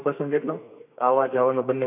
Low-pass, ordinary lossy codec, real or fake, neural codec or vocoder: 3.6 kHz; none; fake; codec, 32 kHz, 1.9 kbps, SNAC